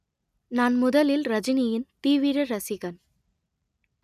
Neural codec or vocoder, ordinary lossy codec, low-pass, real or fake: none; none; 14.4 kHz; real